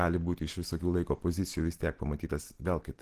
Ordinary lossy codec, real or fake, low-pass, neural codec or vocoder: Opus, 16 kbps; real; 14.4 kHz; none